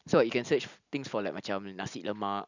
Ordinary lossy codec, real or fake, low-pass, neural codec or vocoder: none; real; 7.2 kHz; none